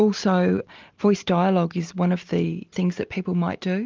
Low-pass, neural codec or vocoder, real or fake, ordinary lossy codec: 7.2 kHz; none; real; Opus, 32 kbps